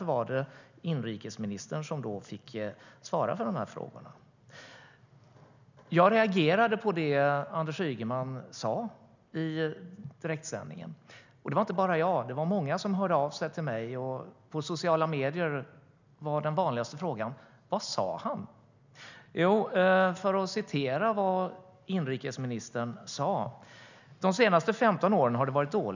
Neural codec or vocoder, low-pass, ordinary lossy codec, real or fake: none; 7.2 kHz; none; real